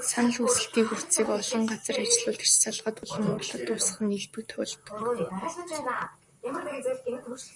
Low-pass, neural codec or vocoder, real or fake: 10.8 kHz; vocoder, 44.1 kHz, 128 mel bands, Pupu-Vocoder; fake